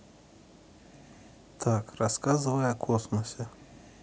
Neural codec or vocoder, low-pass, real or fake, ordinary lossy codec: none; none; real; none